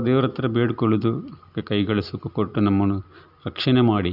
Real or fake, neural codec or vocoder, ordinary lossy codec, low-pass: real; none; none; 5.4 kHz